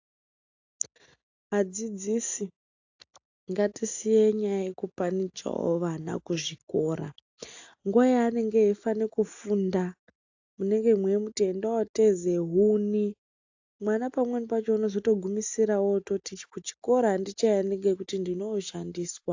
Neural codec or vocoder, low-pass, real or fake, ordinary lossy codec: none; 7.2 kHz; real; AAC, 48 kbps